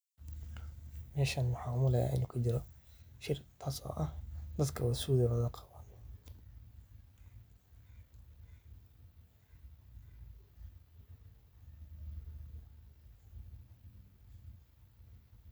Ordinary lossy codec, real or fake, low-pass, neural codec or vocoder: none; real; none; none